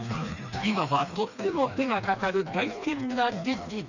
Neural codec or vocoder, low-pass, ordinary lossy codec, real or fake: codec, 16 kHz, 2 kbps, FreqCodec, smaller model; 7.2 kHz; none; fake